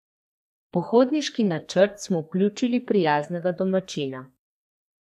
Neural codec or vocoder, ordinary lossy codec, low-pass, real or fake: codec, 32 kHz, 1.9 kbps, SNAC; none; 14.4 kHz; fake